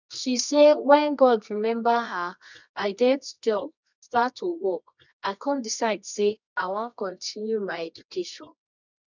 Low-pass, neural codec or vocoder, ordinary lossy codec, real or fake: 7.2 kHz; codec, 24 kHz, 0.9 kbps, WavTokenizer, medium music audio release; none; fake